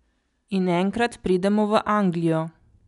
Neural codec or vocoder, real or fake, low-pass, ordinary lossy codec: none; real; 10.8 kHz; none